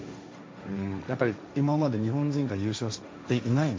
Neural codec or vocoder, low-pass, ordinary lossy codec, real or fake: codec, 16 kHz, 1.1 kbps, Voila-Tokenizer; none; none; fake